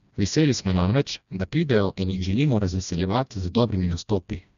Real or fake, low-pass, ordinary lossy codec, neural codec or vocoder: fake; 7.2 kHz; none; codec, 16 kHz, 1 kbps, FreqCodec, smaller model